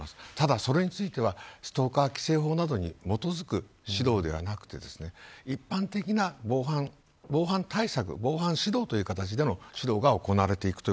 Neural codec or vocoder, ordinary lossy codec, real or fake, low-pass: none; none; real; none